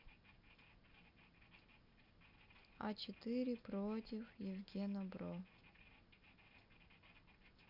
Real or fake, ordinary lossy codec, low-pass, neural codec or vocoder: real; none; 5.4 kHz; none